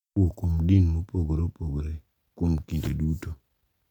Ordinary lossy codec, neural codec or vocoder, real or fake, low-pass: none; none; real; 19.8 kHz